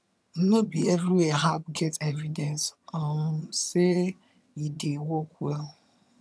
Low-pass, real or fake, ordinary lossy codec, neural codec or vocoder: none; fake; none; vocoder, 22.05 kHz, 80 mel bands, HiFi-GAN